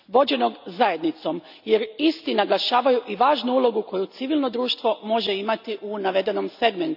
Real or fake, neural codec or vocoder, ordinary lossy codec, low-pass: real; none; none; 5.4 kHz